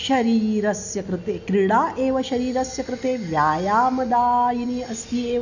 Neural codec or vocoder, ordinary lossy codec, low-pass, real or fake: none; none; 7.2 kHz; real